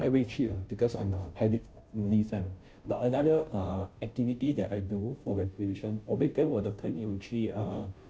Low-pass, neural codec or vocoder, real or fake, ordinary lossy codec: none; codec, 16 kHz, 0.5 kbps, FunCodec, trained on Chinese and English, 25 frames a second; fake; none